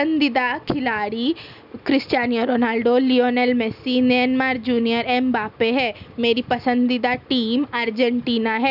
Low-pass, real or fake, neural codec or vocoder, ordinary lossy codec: 5.4 kHz; real; none; none